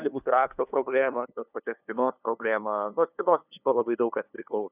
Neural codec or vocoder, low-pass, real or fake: codec, 16 kHz, 2 kbps, FunCodec, trained on LibriTTS, 25 frames a second; 3.6 kHz; fake